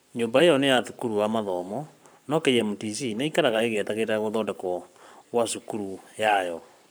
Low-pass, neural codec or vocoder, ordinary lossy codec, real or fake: none; codec, 44.1 kHz, 7.8 kbps, Pupu-Codec; none; fake